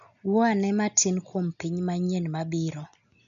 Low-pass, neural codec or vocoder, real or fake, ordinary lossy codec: 7.2 kHz; none; real; none